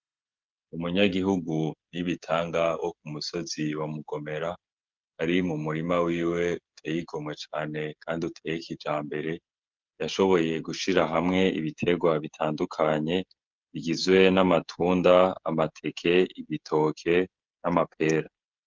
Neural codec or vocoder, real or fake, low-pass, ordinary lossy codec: codec, 16 kHz, 16 kbps, FreqCodec, smaller model; fake; 7.2 kHz; Opus, 24 kbps